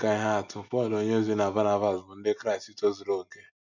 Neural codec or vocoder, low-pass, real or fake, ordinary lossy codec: none; 7.2 kHz; real; none